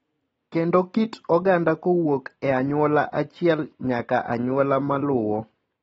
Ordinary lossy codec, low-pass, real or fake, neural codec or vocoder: AAC, 24 kbps; 19.8 kHz; real; none